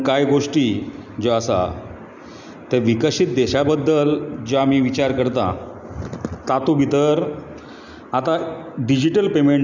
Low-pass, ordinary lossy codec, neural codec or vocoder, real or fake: 7.2 kHz; none; none; real